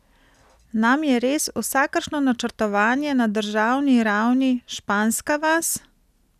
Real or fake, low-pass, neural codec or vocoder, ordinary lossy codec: real; 14.4 kHz; none; none